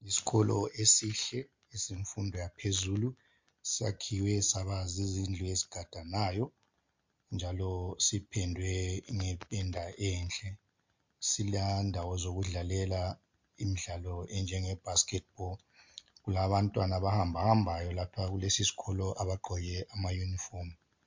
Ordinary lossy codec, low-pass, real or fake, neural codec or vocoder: MP3, 48 kbps; 7.2 kHz; real; none